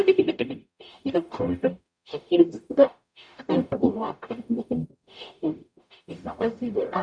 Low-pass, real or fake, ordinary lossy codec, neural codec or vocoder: 9.9 kHz; fake; none; codec, 44.1 kHz, 0.9 kbps, DAC